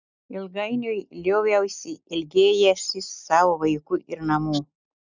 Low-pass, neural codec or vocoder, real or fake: 7.2 kHz; none; real